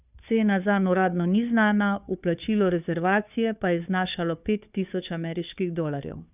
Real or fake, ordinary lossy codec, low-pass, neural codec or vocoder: fake; none; 3.6 kHz; codec, 16 kHz, 2 kbps, FunCodec, trained on Chinese and English, 25 frames a second